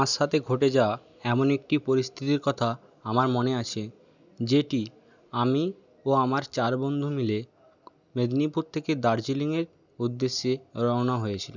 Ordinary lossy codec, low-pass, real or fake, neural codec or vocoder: none; 7.2 kHz; real; none